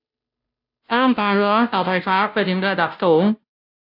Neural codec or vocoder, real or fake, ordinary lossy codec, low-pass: codec, 16 kHz, 0.5 kbps, FunCodec, trained on Chinese and English, 25 frames a second; fake; AAC, 48 kbps; 5.4 kHz